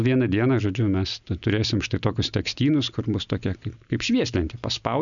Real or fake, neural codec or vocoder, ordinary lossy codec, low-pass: real; none; MP3, 96 kbps; 7.2 kHz